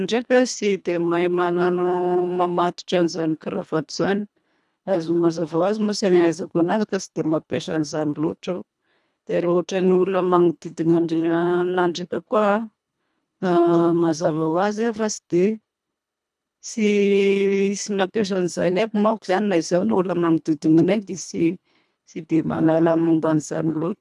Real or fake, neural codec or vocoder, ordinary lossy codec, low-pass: fake; codec, 24 kHz, 1.5 kbps, HILCodec; none; none